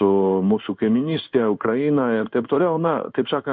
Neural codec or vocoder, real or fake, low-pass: codec, 16 kHz in and 24 kHz out, 1 kbps, XY-Tokenizer; fake; 7.2 kHz